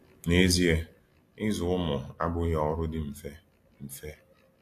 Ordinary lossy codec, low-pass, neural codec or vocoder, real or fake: AAC, 64 kbps; 14.4 kHz; none; real